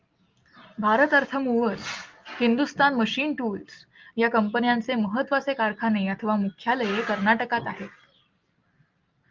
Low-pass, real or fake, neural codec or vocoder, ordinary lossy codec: 7.2 kHz; real; none; Opus, 32 kbps